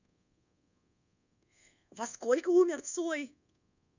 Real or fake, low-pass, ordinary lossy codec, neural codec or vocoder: fake; 7.2 kHz; none; codec, 24 kHz, 1.2 kbps, DualCodec